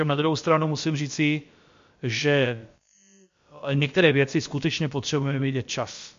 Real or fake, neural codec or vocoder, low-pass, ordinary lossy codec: fake; codec, 16 kHz, about 1 kbps, DyCAST, with the encoder's durations; 7.2 kHz; MP3, 48 kbps